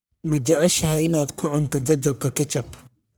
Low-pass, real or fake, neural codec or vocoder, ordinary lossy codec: none; fake; codec, 44.1 kHz, 1.7 kbps, Pupu-Codec; none